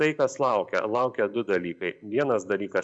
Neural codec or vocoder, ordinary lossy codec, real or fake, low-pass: vocoder, 44.1 kHz, 128 mel bands every 512 samples, BigVGAN v2; MP3, 96 kbps; fake; 9.9 kHz